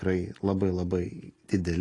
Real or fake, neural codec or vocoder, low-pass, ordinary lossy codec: real; none; 10.8 kHz; AAC, 32 kbps